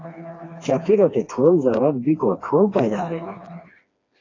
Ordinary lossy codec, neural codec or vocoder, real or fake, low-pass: AAC, 32 kbps; codec, 16 kHz, 2 kbps, FreqCodec, smaller model; fake; 7.2 kHz